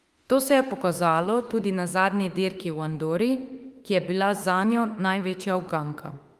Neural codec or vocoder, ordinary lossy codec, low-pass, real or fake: autoencoder, 48 kHz, 32 numbers a frame, DAC-VAE, trained on Japanese speech; Opus, 32 kbps; 14.4 kHz; fake